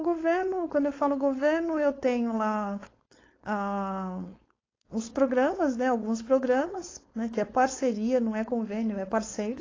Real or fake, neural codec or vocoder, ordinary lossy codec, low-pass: fake; codec, 16 kHz, 4.8 kbps, FACodec; AAC, 32 kbps; 7.2 kHz